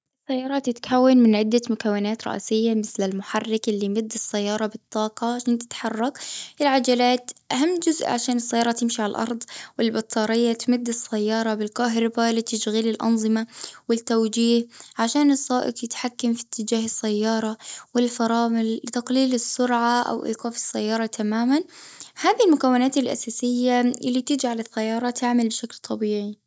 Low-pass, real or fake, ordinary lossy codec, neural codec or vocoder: none; real; none; none